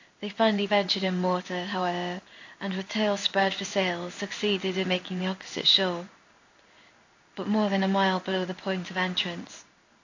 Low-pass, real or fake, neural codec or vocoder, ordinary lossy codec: 7.2 kHz; fake; codec, 16 kHz in and 24 kHz out, 1 kbps, XY-Tokenizer; AAC, 48 kbps